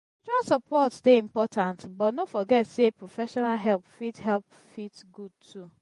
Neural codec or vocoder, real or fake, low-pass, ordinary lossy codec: vocoder, 48 kHz, 128 mel bands, Vocos; fake; 14.4 kHz; MP3, 48 kbps